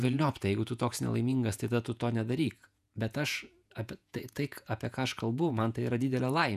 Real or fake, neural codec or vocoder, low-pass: fake; vocoder, 48 kHz, 128 mel bands, Vocos; 14.4 kHz